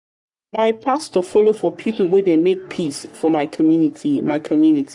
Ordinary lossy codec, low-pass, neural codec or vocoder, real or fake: none; 10.8 kHz; codec, 44.1 kHz, 3.4 kbps, Pupu-Codec; fake